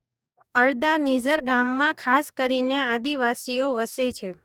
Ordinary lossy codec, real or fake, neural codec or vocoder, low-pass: none; fake; codec, 44.1 kHz, 2.6 kbps, DAC; 19.8 kHz